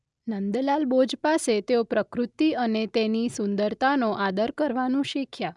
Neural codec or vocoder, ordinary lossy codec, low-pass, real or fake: none; none; 10.8 kHz; real